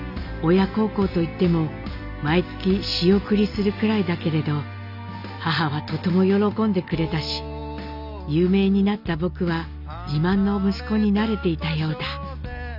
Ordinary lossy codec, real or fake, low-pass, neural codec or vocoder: none; real; 5.4 kHz; none